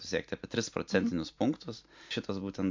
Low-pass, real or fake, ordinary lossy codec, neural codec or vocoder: 7.2 kHz; real; MP3, 48 kbps; none